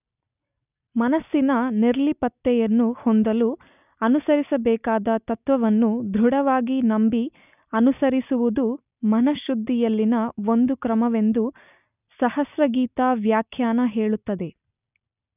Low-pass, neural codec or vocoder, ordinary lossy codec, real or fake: 3.6 kHz; none; none; real